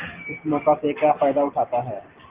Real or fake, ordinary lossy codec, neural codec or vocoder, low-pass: real; Opus, 32 kbps; none; 3.6 kHz